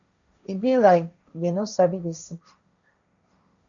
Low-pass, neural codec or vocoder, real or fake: 7.2 kHz; codec, 16 kHz, 1.1 kbps, Voila-Tokenizer; fake